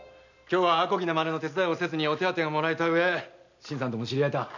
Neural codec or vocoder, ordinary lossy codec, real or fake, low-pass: none; none; real; 7.2 kHz